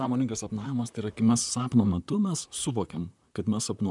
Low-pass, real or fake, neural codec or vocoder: 10.8 kHz; fake; vocoder, 44.1 kHz, 128 mel bands, Pupu-Vocoder